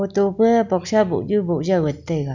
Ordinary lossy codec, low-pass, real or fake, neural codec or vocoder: none; 7.2 kHz; real; none